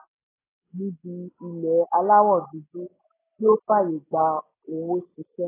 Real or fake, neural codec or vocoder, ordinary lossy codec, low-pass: real; none; AAC, 16 kbps; 3.6 kHz